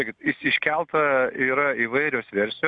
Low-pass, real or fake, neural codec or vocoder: 9.9 kHz; real; none